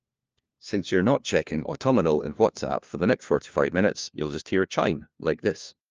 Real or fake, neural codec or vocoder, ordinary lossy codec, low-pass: fake; codec, 16 kHz, 1 kbps, FunCodec, trained on LibriTTS, 50 frames a second; Opus, 24 kbps; 7.2 kHz